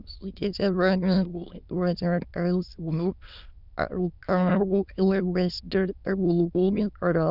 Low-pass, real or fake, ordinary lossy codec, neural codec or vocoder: 5.4 kHz; fake; none; autoencoder, 22.05 kHz, a latent of 192 numbers a frame, VITS, trained on many speakers